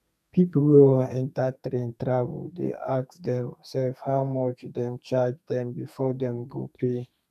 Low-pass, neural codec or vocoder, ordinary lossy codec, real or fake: 14.4 kHz; codec, 32 kHz, 1.9 kbps, SNAC; none; fake